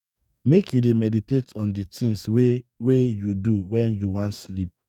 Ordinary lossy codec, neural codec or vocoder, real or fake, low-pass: none; codec, 44.1 kHz, 2.6 kbps, DAC; fake; 19.8 kHz